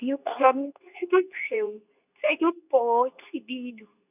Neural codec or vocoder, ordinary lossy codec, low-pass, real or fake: codec, 24 kHz, 0.9 kbps, WavTokenizer, medium speech release version 2; none; 3.6 kHz; fake